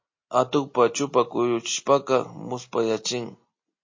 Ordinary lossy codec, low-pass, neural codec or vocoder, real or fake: MP3, 32 kbps; 7.2 kHz; none; real